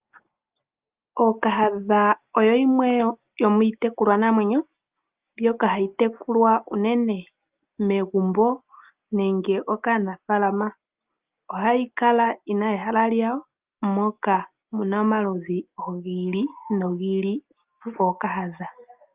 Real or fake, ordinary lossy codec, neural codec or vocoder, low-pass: real; Opus, 24 kbps; none; 3.6 kHz